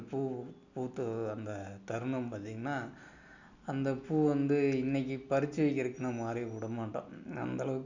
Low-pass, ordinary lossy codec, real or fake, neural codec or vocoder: 7.2 kHz; none; real; none